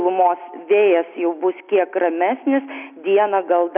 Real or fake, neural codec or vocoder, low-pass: real; none; 3.6 kHz